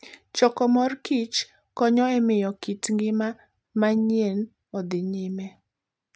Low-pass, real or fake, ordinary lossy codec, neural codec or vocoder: none; real; none; none